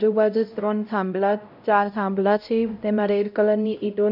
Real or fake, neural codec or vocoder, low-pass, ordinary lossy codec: fake; codec, 16 kHz, 0.5 kbps, X-Codec, HuBERT features, trained on LibriSpeech; 5.4 kHz; none